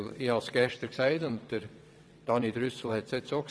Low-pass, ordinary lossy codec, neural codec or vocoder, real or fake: none; none; vocoder, 22.05 kHz, 80 mel bands, WaveNeXt; fake